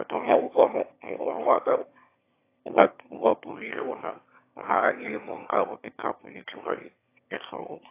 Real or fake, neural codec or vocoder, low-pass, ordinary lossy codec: fake; autoencoder, 22.05 kHz, a latent of 192 numbers a frame, VITS, trained on one speaker; 3.6 kHz; AAC, 24 kbps